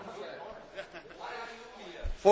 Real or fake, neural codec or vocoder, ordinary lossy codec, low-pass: real; none; none; none